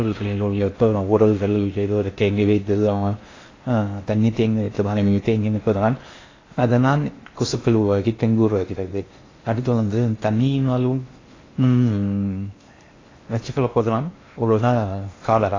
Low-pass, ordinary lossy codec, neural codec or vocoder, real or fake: 7.2 kHz; AAC, 32 kbps; codec, 16 kHz in and 24 kHz out, 0.6 kbps, FocalCodec, streaming, 4096 codes; fake